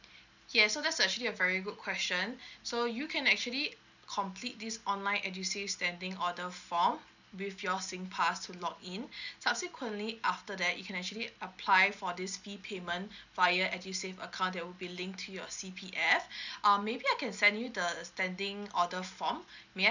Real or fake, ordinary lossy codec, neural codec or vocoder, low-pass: real; none; none; 7.2 kHz